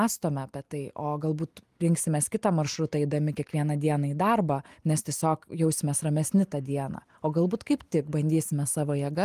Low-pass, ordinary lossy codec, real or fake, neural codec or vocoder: 14.4 kHz; Opus, 32 kbps; real; none